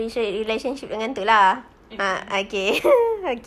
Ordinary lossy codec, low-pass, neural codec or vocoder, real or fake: none; 14.4 kHz; none; real